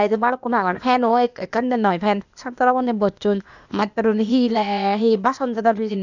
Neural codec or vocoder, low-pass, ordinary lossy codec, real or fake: codec, 16 kHz, 0.8 kbps, ZipCodec; 7.2 kHz; none; fake